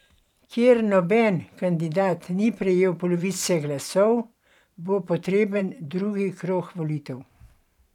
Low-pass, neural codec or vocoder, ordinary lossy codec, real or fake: 19.8 kHz; vocoder, 44.1 kHz, 128 mel bands every 512 samples, BigVGAN v2; none; fake